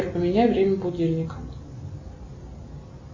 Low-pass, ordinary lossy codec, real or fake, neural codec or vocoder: 7.2 kHz; MP3, 32 kbps; real; none